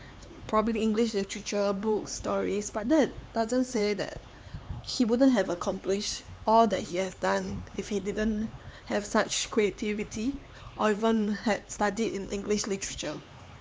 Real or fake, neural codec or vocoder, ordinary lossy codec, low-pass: fake; codec, 16 kHz, 4 kbps, X-Codec, HuBERT features, trained on LibriSpeech; none; none